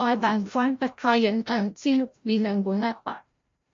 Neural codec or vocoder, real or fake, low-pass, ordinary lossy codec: codec, 16 kHz, 0.5 kbps, FreqCodec, larger model; fake; 7.2 kHz; MP3, 48 kbps